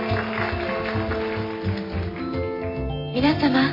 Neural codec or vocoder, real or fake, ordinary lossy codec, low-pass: none; real; AAC, 24 kbps; 5.4 kHz